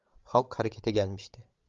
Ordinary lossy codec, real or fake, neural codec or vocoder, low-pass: Opus, 32 kbps; fake; codec, 16 kHz, 16 kbps, FreqCodec, larger model; 7.2 kHz